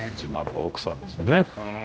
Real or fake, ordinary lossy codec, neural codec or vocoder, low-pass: fake; none; codec, 16 kHz, 1 kbps, X-Codec, HuBERT features, trained on general audio; none